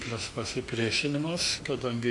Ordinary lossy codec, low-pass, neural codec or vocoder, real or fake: MP3, 96 kbps; 10.8 kHz; autoencoder, 48 kHz, 32 numbers a frame, DAC-VAE, trained on Japanese speech; fake